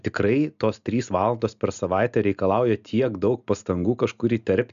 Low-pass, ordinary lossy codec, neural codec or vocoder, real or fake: 7.2 kHz; MP3, 96 kbps; none; real